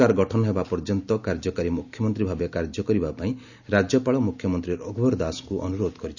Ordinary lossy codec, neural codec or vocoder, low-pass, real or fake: none; none; 7.2 kHz; real